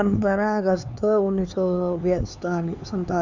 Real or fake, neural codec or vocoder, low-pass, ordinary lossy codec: fake; codec, 16 kHz, 4 kbps, X-Codec, HuBERT features, trained on LibriSpeech; 7.2 kHz; none